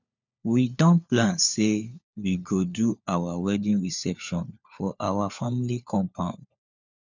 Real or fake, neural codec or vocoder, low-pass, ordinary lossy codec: fake; codec, 16 kHz, 4 kbps, FunCodec, trained on LibriTTS, 50 frames a second; 7.2 kHz; none